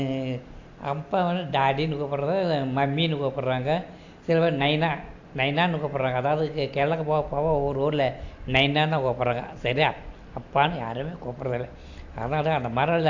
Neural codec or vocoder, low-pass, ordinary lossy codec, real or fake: none; 7.2 kHz; AAC, 48 kbps; real